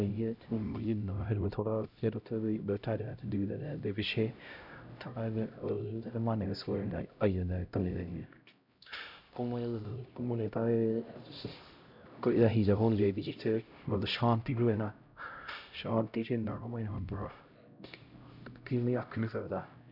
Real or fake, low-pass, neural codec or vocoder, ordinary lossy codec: fake; 5.4 kHz; codec, 16 kHz, 0.5 kbps, X-Codec, HuBERT features, trained on LibriSpeech; none